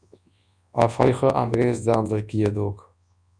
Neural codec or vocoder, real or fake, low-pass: codec, 24 kHz, 0.9 kbps, WavTokenizer, large speech release; fake; 9.9 kHz